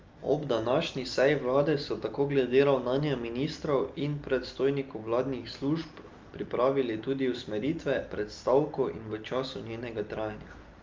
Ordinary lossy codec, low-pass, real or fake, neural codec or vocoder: Opus, 32 kbps; 7.2 kHz; real; none